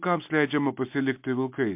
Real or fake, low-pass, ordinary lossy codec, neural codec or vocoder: real; 3.6 kHz; MP3, 32 kbps; none